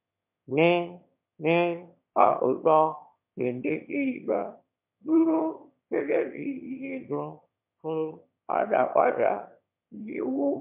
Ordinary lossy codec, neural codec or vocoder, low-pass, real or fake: MP3, 32 kbps; autoencoder, 22.05 kHz, a latent of 192 numbers a frame, VITS, trained on one speaker; 3.6 kHz; fake